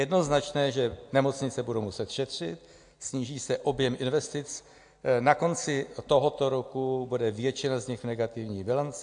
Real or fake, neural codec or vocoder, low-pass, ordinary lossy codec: real; none; 9.9 kHz; AAC, 64 kbps